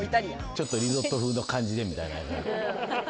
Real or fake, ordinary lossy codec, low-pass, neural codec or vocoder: real; none; none; none